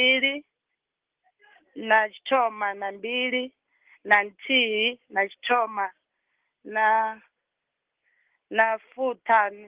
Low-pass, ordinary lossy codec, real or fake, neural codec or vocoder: 3.6 kHz; Opus, 16 kbps; real; none